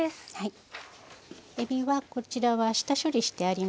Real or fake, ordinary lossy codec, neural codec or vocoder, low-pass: real; none; none; none